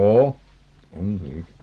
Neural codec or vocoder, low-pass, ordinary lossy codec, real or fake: none; 10.8 kHz; Opus, 16 kbps; real